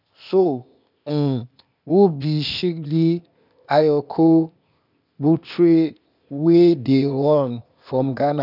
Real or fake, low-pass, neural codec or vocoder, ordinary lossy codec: fake; 5.4 kHz; codec, 16 kHz, 0.8 kbps, ZipCodec; none